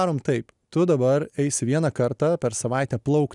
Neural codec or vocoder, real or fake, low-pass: none; real; 10.8 kHz